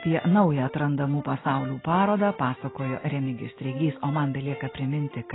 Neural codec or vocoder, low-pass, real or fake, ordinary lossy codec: none; 7.2 kHz; real; AAC, 16 kbps